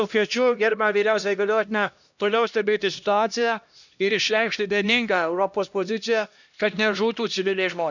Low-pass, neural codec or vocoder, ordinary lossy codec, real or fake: 7.2 kHz; codec, 16 kHz, 1 kbps, X-Codec, HuBERT features, trained on LibriSpeech; none; fake